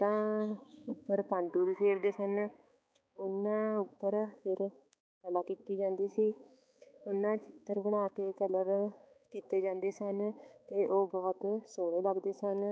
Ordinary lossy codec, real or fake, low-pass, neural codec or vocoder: none; fake; none; codec, 16 kHz, 4 kbps, X-Codec, HuBERT features, trained on balanced general audio